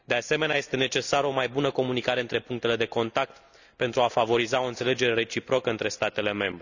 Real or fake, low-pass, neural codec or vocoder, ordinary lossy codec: real; 7.2 kHz; none; none